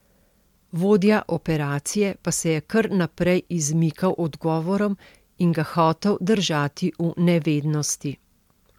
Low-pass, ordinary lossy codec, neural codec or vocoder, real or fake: 19.8 kHz; MP3, 96 kbps; none; real